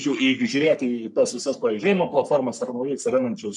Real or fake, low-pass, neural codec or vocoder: fake; 10.8 kHz; codec, 44.1 kHz, 3.4 kbps, Pupu-Codec